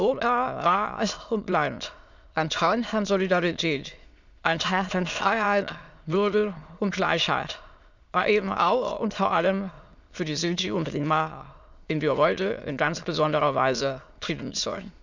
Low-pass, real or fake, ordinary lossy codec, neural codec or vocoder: 7.2 kHz; fake; none; autoencoder, 22.05 kHz, a latent of 192 numbers a frame, VITS, trained on many speakers